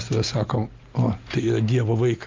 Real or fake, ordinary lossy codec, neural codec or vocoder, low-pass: real; Opus, 24 kbps; none; 7.2 kHz